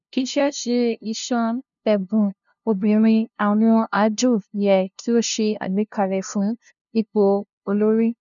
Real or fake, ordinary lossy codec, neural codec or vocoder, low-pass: fake; none; codec, 16 kHz, 0.5 kbps, FunCodec, trained on LibriTTS, 25 frames a second; 7.2 kHz